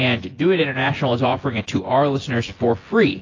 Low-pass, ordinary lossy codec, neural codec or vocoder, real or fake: 7.2 kHz; AAC, 32 kbps; vocoder, 24 kHz, 100 mel bands, Vocos; fake